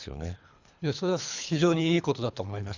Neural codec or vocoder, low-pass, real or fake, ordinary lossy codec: codec, 24 kHz, 3 kbps, HILCodec; 7.2 kHz; fake; Opus, 64 kbps